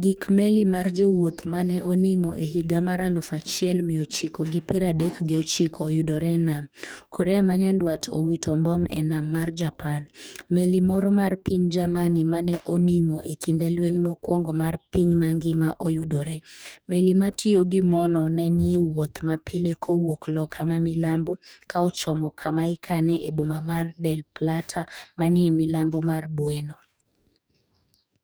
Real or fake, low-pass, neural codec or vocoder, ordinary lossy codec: fake; none; codec, 44.1 kHz, 2.6 kbps, DAC; none